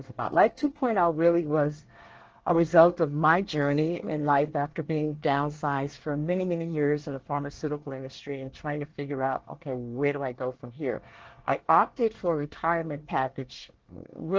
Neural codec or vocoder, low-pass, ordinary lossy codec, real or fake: codec, 24 kHz, 1 kbps, SNAC; 7.2 kHz; Opus, 16 kbps; fake